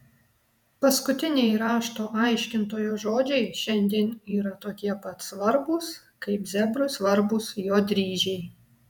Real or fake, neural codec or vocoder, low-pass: fake; vocoder, 48 kHz, 128 mel bands, Vocos; 19.8 kHz